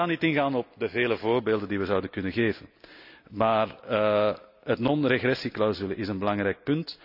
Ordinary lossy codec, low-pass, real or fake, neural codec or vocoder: none; 5.4 kHz; real; none